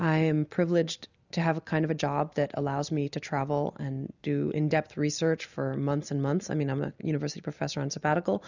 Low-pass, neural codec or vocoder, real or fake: 7.2 kHz; none; real